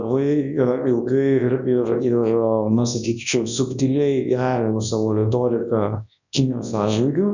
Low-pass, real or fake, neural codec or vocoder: 7.2 kHz; fake; codec, 24 kHz, 0.9 kbps, WavTokenizer, large speech release